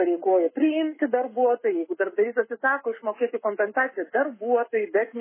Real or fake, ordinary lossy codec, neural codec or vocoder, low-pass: fake; MP3, 16 kbps; codec, 44.1 kHz, 7.8 kbps, Pupu-Codec; 3.6 kHz